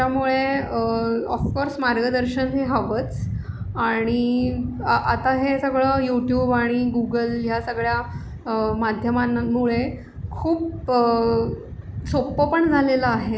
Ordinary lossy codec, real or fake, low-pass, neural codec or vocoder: none; real; none; none